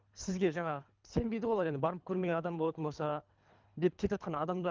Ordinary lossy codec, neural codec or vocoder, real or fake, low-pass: Opus, 32 kbps; codec, 24 kHz, 3 kbps, HILCodec; fake; 7.2 kHz